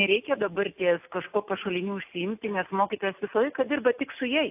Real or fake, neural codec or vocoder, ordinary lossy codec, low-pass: real; none; AAC, 32 kbps; 3.6 kHz